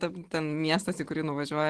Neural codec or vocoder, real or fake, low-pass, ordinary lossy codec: none; real; 10.8 kHz; Opus, 16 kbps